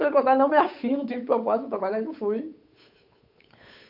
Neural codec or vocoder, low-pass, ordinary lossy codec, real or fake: codec, 16 kHz, 8 kbps, FunCodec, trained on Chinese and English, 25 frames a second; 5.4 kHz; AAC, 48 kbps; fake